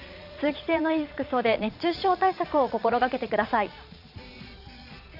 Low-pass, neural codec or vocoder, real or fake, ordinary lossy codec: 5.4 kHz; vocoder, 22.05 kHz, 80 mel bands, Vocos; fake; none